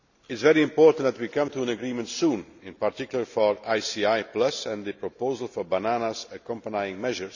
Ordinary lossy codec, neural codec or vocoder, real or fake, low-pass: none; none; real; 7.2 kHz